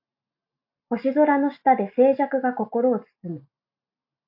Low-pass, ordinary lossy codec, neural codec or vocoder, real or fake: 5.4 kHz; AAC, 48 kbps; none; real